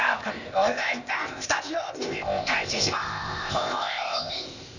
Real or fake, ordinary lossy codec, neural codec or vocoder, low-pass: fake; Opus, 64 kbps; codec, 16 kHz, 0.8 kbps, ZipCodec; 7.2 kHz